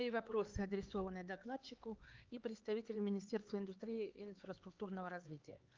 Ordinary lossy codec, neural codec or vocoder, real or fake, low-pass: Opus, 32 kbps; codec, 16 kHz, 4 kbps, X-Codec, HuBERT features, trained on LibriSpeech; fake; 7.2 kHz